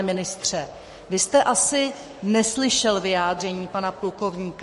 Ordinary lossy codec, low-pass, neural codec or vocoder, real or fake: MP3, 48 kbps; 14.4 kHz; codec, 44.1 kHz, 7.8 kbps, Pupu-Codec; fake